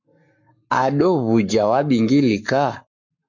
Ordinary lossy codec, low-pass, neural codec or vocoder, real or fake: MP3, 48 kbps; 7.2 kHz; codec, 44.1 kHz, 7.8 kbps, Pupu-Codec; fake